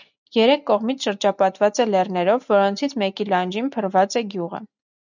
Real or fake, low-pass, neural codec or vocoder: real; 7.2 kHz; none